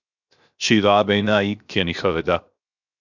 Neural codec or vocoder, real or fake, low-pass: codec, 16 kHz, 0.7 kbps, FocalCodec; fake; 7.2 kHz